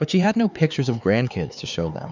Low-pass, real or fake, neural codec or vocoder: 7.2 kHz; fake; codec, 16 kHz, 4 kbps, X-Codec, HuBERT features, trained on LibriSpeech